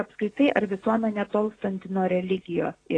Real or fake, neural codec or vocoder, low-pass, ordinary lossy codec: real; none; 9.9 kHz; AAC, 32 kbps